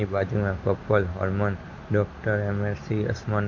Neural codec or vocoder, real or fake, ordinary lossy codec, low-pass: none; real; MP3, 32 kbps; 7.2 kHz